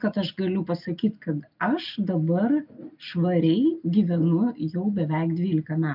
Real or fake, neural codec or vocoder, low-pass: real; none; 5.4 kHz